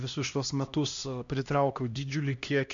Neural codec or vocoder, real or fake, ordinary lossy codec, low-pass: codec, 16 kHz, 1 kbps, X-Codec, HuBERT features, trained on LibriSpeech; fake; MP3, 64 kbps; 7.2 kHz